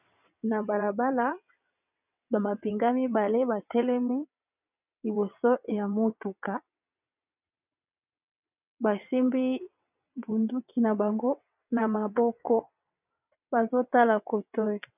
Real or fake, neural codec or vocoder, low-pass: fake; vocoder, 22.05 kHz, 80 mel bands, WaveNeXt; 3.6 kHz